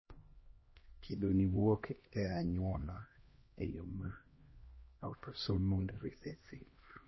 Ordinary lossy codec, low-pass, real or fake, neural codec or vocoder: MP3, 24 kbps; 7.2 kHz; fake; codec, 16 kHz, 1 kbps, X-Codec, HuBERT features, trained on LibriSpeech